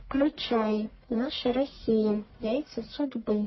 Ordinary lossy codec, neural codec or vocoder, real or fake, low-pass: MP3, 24 kbps; codec, 44.1 kHz, 1.7 kbps, Pupu-Codec; fake; 7.2 kHz